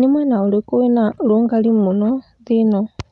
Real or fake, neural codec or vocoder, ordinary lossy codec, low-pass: real; none; none; 10.8 kHz